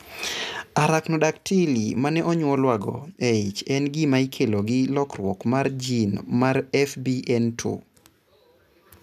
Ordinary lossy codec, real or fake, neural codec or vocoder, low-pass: none; real; none; 14.4 kHz